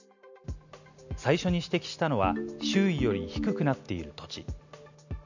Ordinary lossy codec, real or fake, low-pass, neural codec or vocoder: none; real; 7.2 kHz; none